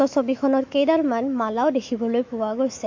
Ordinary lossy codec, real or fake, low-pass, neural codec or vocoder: MP3, 48 kbps; fake; 7.2 kHz; autoencoder, 48 kHz, 128 numbers a frame, DAC-VAE, trained on Japanese speech